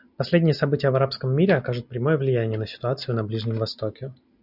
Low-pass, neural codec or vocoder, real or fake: 5.4 kHz; none; real